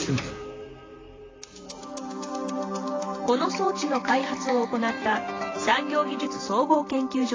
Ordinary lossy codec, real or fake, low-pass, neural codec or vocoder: AAC, 32 kbps; fake; 7.2 kHz; vocoder, 44.1 kHz, 128 mel bands, Pupu-Vocoder